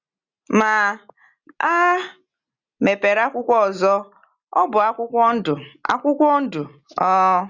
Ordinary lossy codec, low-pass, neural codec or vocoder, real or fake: Opus, 64 kbps; 7.2 kHz; none; real